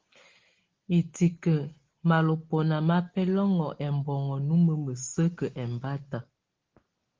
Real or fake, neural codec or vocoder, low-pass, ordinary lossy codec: real; none; 7.2 kHz; Opus, 16 kbps